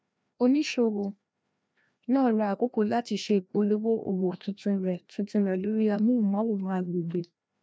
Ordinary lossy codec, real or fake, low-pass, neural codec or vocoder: none; fake; none; codec, 16 kHz, 1 kbps, FreqCodec, larger model